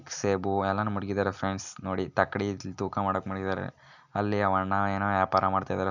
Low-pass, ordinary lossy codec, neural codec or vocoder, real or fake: 7.2 kHz; none; none; real